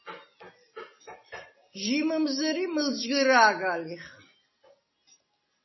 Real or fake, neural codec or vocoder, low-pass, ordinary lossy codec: real; none; 7.2 kHz; MP3, 24 kbps